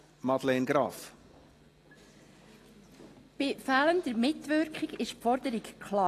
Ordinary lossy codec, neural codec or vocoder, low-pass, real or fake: AAC, 48 kbps; none; 14.4 kHz; real